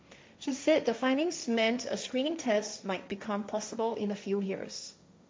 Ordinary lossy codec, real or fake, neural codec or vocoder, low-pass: none; fake; codec, 16 kHz, 1.1 kbps, Voila-Tokenizer; none